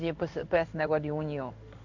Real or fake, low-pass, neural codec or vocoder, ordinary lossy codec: fake; 7.2 kHz; codec, 16 kHz in and 24 kHz out, 1 kbps, XY-Tokenizer; Opus, 64 kbps